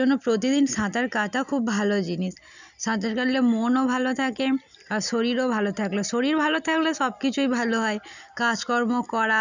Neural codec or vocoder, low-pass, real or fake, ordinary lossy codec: none; 7.2 kHz; real; none